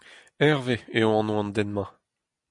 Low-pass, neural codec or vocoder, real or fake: 10.8 kHz; none; real